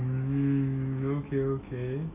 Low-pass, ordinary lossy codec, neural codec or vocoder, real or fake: 3.6 kHz; AAC, 24 kbps; autoencoder, 48 kHz, 128 numbers a frame, DAC-VAE, trained on Japanese speech; fake